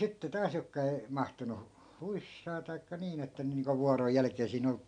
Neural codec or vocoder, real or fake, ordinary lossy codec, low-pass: none; real; none; 9.9 kHz